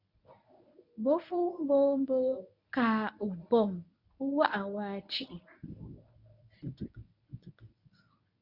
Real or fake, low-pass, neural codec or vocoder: fake; 5.4 kHz; codec, 24 kHz, 0.9 kbps, WavTokenizer, medium speech release version 1